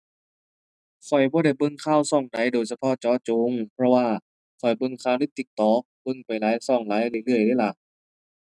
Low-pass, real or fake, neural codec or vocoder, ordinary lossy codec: none; real; none; none